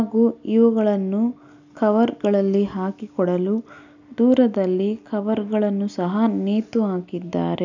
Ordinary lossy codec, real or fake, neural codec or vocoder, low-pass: none; real; none; 7.2 kHz